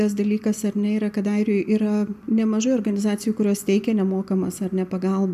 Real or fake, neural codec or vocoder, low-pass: real; none; 14.4 kHz